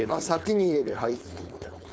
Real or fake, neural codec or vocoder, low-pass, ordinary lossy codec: fake; codec, 16 kHz, 4.8 kbps, FACodec; none; none